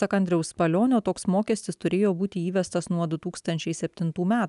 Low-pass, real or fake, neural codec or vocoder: 10.8 kHz; real; none